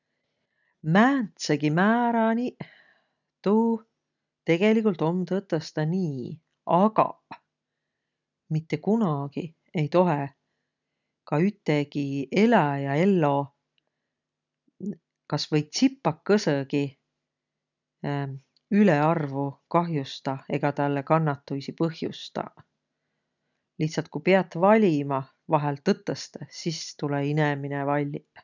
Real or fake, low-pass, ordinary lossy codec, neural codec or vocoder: real; 7.2 kHz; none; none